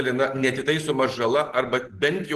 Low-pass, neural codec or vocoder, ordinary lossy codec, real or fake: 14.4 kHz; none; Opus, 16 kbps; real